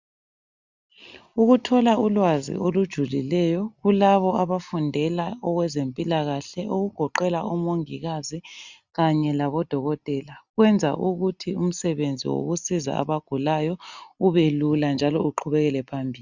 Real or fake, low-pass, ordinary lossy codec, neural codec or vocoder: real; 7.2 kHz; Opus, 64 kbps; none